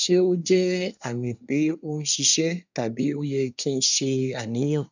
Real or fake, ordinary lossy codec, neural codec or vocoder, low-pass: fake; none; codec, 24 kHz, 1 kbps, SNAC; 7.2 kHz